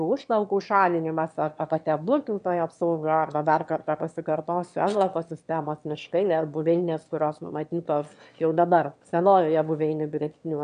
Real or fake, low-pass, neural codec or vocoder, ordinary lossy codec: fake; 9.9 kHz; autoencoder, 22.05 kHz, a latent of 192 numbers a frame, VITS, trained on one speaker; MP3, 64 kbps